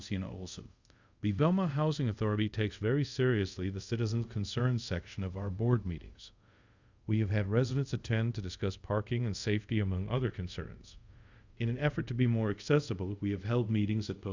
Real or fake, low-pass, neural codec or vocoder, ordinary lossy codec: fake; 7.2 kHz; codec, 24 kHz, 0.5 kbps, DualCodec; Opus, 64 kbps